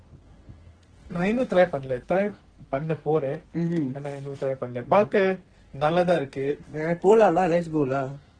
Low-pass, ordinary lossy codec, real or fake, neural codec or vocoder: 9.9 kHz; Opus, 16 kbps; fake; codec, 44.1 kHz, 2.6 kbps, SNAC